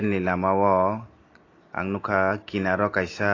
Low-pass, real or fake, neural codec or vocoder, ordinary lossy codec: 7.2 kHz; real; none; AAC, 32 kbps